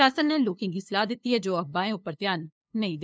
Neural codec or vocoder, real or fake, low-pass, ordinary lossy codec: codec, 16 kHz, 2 kbps, FunCodec, trained on LibriTTS, 25 frames a second; fake; none; none